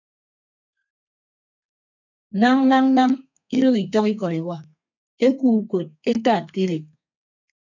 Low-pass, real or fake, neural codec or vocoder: 7.2 kHz; fake; codec, 32 kHz, 1.9 kbps, SNAC